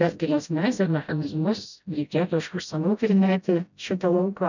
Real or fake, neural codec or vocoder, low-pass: fake; codec, 16 kHz, 0.5 kbps, FreqCodec, smaller model; 7.2 kHz